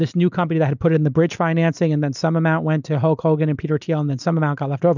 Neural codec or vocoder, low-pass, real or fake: none; 7.2 kHz; real